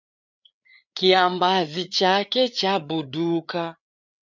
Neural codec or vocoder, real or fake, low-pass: codec, 16 kHz, 4 kbps, FreqCodec, larger model; fake; 7.2 kHz